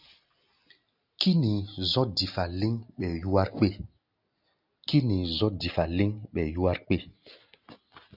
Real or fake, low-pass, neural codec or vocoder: real; 5.4 kHz; none